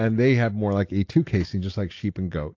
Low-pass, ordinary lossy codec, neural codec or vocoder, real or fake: 7.2 kHz; AAC, 48 kbps; none; real